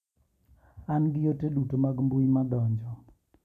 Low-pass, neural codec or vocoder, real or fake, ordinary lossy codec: 14.4 kHz; none; real; none